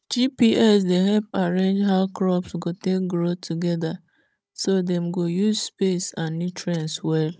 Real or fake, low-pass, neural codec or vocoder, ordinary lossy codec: fake; none; codec, 16 kHz, 16 kbps, FunCodec, trained on Chinese and English, 50 frames a second; none